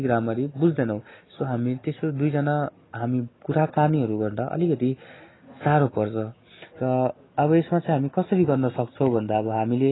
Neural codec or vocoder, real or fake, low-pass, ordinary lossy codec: none; real; 7.2 kHz; AAC, 16 kbps